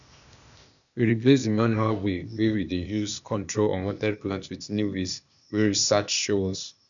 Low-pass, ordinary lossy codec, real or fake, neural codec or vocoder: 7.2 kHz; none; fake; codec, 16 kHz, 0.8 kbps, ZipCodec